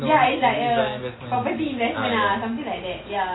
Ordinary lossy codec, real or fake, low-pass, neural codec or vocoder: AAC, 16 kbps; real; 7.2 kHz; none